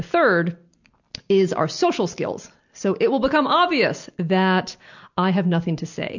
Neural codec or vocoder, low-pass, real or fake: none; 7.2 kHz; real